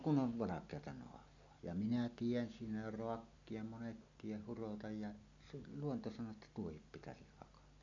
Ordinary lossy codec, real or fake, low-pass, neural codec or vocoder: none; real; 7.2 kHz; none